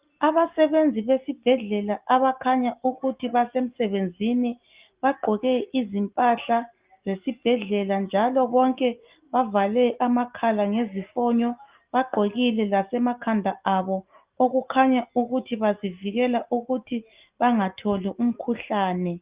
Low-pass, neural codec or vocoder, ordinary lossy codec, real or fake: 3.6 kHz; none; Opus, 32 kbps; real